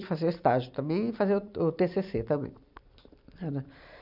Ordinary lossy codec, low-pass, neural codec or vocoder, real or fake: none; 5.4 kHz; vocoder, 44.1 kHz, 128 mel bands every 256 samples, BigVGAN v2; fake